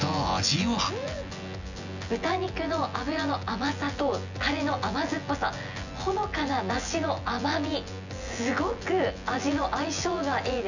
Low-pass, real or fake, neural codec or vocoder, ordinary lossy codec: 7.2 kHz; fake; vocoder, 24 kHz, 100 mel bands, Vocos; none